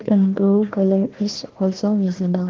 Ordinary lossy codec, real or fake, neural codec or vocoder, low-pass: Opus, 16 kbps; fake; codec, 16 kHz, 1 kbps, FunCodec, trained on Chinese and English, 50 frames a second; 7.2 kHz